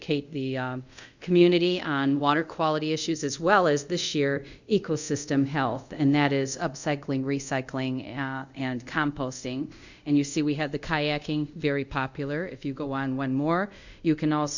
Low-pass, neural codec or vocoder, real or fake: 7.2 kHz; codec, 24 kHz, 0.5 kbps, DualCodec; fake